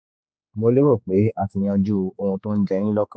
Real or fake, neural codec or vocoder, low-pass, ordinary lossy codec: fake; codec, 16 kHz, 4 kbps, X-Codec, HuBERT features, trained on general audio; none; none